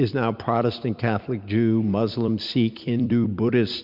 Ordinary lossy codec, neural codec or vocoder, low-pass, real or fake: Opus, 64 kbps; vocoder, 44.1 kHz, 128 mel bands every 256 samples, BigVGAN v2; 5.4 kHz; fake